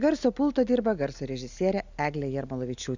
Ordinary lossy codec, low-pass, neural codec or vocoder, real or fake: Opus, 64 kbps; 7.2 kHz; none; real